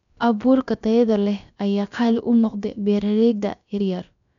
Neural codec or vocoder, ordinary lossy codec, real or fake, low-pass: codec, 16 kHz, about 1 kbps, DyCAST, with the encoder's durations; none; fake; 7.2 kHz